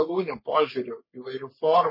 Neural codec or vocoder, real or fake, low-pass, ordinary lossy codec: codec, 44.1 kHz, 3.4 kbps, Pupu-Codec; fake; 5.4 kHz; MP3, 24 kbps